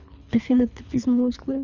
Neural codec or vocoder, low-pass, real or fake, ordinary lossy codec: codec, 24 kHz, 3 kbps, HILCodec; 7.2 kHz; fake; none